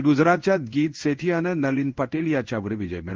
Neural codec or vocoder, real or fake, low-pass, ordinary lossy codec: codec, 16 kHz in and 24 kHz out, 1 kbps, XY-Tokenizer; fake; 7.2 kHz; Opus, 16 kbps